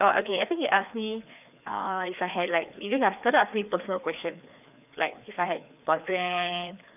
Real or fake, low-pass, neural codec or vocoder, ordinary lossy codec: fake; 3.6 kHz; codec, 16 kHz, 2 kbps, FreqCodec, larger model; none